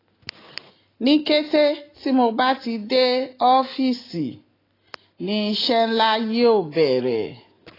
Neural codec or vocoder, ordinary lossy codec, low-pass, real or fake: none; AAC, 24 kbps; 5.4 kHz; real